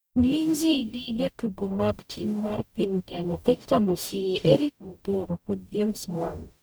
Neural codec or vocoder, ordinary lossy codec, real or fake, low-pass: codec, 44.1 kHz, 0.9 kbps, DAC; none; fake; none